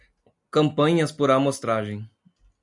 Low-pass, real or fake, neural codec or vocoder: 10.8 kHz; real; none